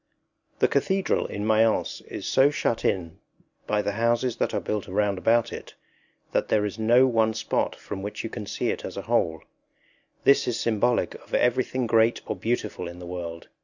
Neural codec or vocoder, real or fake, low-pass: none; real; 7.2 kHz